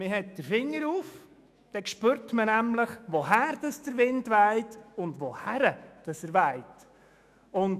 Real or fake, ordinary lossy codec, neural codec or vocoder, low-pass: fake; none; autoencoder, 48 kHz, 128 numbers a frame, DAC-VAE, trained on Japanese speech; 14.4 kHz